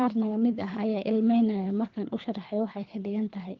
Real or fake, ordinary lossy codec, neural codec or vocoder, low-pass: fake; Opus, 24 kbps; codec, 24 kHz, 3 kbps, HILCodec; 7.2 kHz